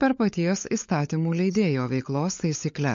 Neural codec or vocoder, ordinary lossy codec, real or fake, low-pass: codec, 16 kHz, 16 kbps, FunCodec, trained on LibriTTS, 50 frames a second; MP3, 48 kbps; fake; 7.2 kHz